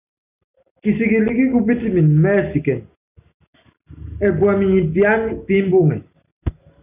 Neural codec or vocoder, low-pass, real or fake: none; 3.6 kHz; real